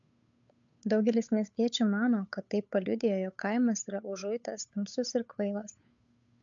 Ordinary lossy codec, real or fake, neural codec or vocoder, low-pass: MP3, 64 kbps; fake; codec, 16 kHz, 8 kbps, FunCodec, trained on Chinese and English, 25 frames a second; 7.2 kHz